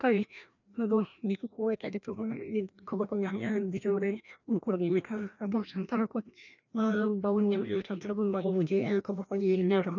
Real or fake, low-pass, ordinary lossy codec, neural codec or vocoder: fake; 7.2 kHz; none; codec, 16 kHz, 1 kbps, FreqCodec, larger model